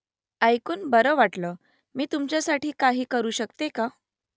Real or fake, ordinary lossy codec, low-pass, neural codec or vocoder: real; none; none; none